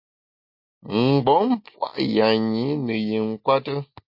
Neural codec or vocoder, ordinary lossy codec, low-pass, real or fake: none; MP3, 32 kbps; 5.4 kHz; real